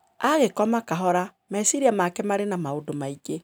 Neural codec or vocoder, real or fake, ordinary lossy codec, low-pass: none; real; none; none